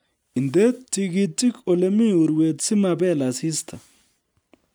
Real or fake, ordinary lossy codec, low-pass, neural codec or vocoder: real; none; none; none